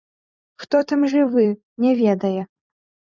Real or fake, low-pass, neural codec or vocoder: fake; 7.2 kHz; vocoder, 44.1 kHz, 128 mel bands every 256 samples, BigVGAN v2